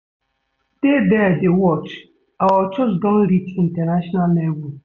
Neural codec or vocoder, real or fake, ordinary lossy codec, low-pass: none; real; none; 7.2 kHz